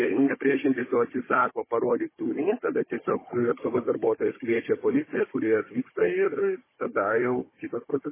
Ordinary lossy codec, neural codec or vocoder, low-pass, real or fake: MP3, 16 kbps; codec, 16 kHz, 16 kbps, FunCodec, trained on Chinese and English, 50 frames a second; 3.6 kHz; fake